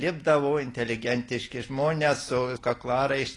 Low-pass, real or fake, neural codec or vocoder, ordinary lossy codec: 10.8 kHz; real; none; AAC, 32 kbps